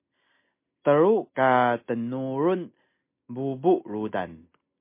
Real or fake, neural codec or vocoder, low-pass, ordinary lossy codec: real; none; 3.6 kHz; MP3, 24 kbps